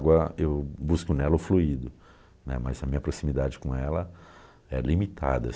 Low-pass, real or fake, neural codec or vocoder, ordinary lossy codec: none; real; none; none